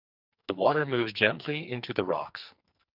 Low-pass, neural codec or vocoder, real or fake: 5.4 kHz; codec, 44.1 kHz, 2.6 kbps, SNAC; fake